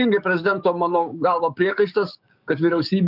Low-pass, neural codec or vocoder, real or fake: 5.4 kHz; codec, 44.1 kHz, 7.8 kbps, DAC; fake